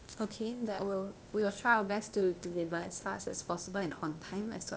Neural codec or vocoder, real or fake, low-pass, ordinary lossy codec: codec, 16 kHz, 0.8 kbps, ZipCodec; fake; none; none